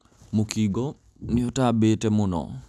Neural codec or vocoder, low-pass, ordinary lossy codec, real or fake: none; none; none; real